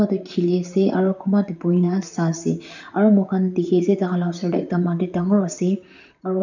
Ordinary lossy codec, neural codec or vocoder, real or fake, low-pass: none; vocoder, 44.1 kHz, 128 mel bands, Pupu-Vocoder; fake; 7.2 kHz